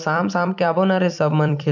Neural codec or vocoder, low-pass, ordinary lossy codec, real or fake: vocoder, 44.1 kHz, 128 mel bands every 256 samples, BigVGAN v2; 7.2 kHz; none; fake